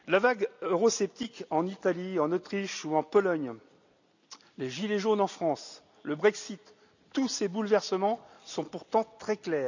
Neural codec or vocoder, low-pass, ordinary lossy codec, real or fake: none; 7.2 kHz; none; real